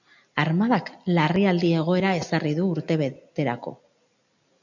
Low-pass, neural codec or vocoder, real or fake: 7.2 kHz; none; real